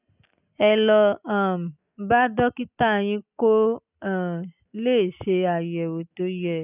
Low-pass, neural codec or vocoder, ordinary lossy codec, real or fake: 3.6 kHz; none; none; real